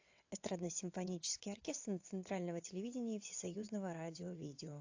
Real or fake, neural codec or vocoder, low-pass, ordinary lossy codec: fake; vocoder, 22.05 kHz, 80 mel bands, WaveNeXt; 7.2 kHz; AAC, 48 kbps